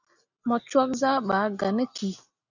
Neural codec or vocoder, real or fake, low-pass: none; real; 7.2 kHz